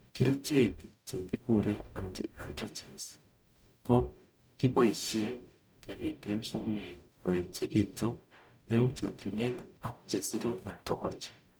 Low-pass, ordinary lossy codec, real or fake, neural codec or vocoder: none; none; fake; codec, 44.1 kHz, 0.9 kbps, DAC